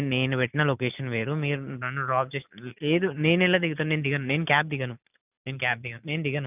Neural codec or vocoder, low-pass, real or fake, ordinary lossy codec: none; 3.6 kHz; real; none